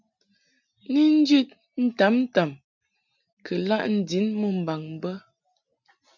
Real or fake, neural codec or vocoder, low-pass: real; none; 7.2 kHz